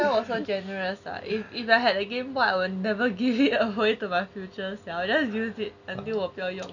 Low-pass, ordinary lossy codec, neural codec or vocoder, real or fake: 7.2 kHz; none; none; real